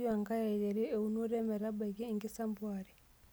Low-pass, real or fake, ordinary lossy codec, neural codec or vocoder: none; real; none; none